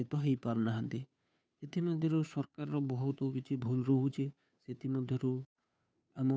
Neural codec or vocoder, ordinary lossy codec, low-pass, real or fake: codec, 16 kHz, 2 kbps, FunCodec, trained on Chinese and English, 25 frames a second; none; none; fake